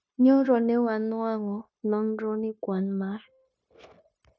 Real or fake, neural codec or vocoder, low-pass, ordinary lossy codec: fake; codec, 16 kHz, 0.9 kbps, LongCat-Audio-Codec; none; none